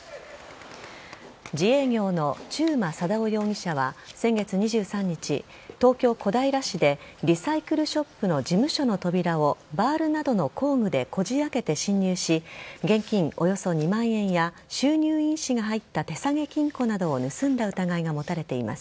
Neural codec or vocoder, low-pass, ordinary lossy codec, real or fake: none; none; none; real